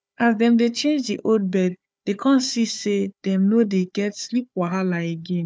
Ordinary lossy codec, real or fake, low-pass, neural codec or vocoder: none; fake; none; codec, 16 kHz, 4 kbps, FunCodec, trained on Chinese and English, 50 frames a second